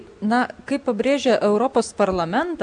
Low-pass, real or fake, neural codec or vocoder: 9.9 kHz; real; none